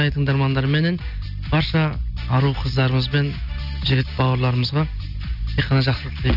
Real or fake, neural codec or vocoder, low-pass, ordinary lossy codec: real; none; 5.4 kHz; none